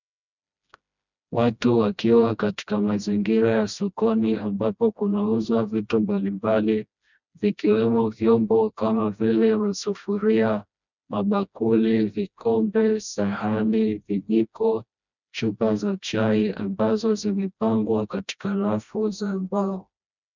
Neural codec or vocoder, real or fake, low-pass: codec, 16 kHz, 1 kbps, FreqCodec, smaller model; fake; 7.2 kHz